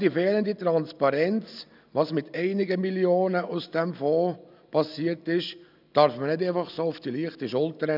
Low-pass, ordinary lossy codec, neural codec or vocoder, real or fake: 5.4 kHz; none; none; real